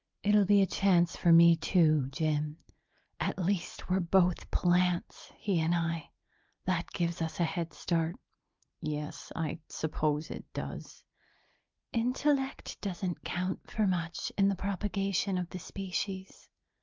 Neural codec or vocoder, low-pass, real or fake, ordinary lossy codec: none; 7.2 kHz; real; Opus, 24 kbps